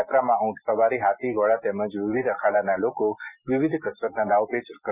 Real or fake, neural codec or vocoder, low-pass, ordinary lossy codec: real; none; 3.6 kHz; none